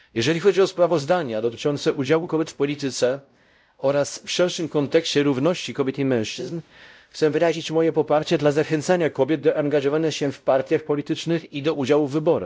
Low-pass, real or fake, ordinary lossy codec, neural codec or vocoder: none; fake; none; codec, 16 kHz, 0.5 kbps, X-Codec, WavLM features, trained on Multilingual LibriSpeech